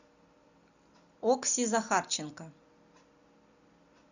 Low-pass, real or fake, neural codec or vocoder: 7.2 kHz; real; none